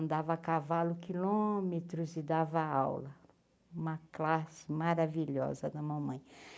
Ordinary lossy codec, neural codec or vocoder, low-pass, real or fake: none; none; none; real